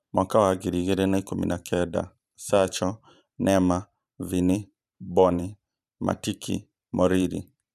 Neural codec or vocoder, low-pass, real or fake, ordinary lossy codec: vocoder, 44.1 kHz, 128 mel bands every 512 samples, BigVGAN v2; 14.4 kHz; fake; none